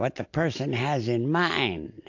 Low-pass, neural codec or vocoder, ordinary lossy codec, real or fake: 7.2 kHz; none; AAC, 48 kbps; real